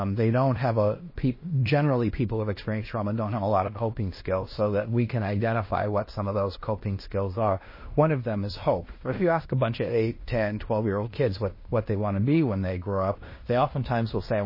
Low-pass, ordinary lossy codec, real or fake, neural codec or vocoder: 5.4 kHz; MP3, 24 kbps; fake; codec, 16 kHz in and 24 kHz out, 0.9 kbps, LongCat-Audio-Codec, fine tuned four codebook decoder